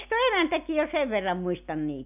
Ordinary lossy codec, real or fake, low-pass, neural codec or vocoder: none; real; 3.6 kHz; none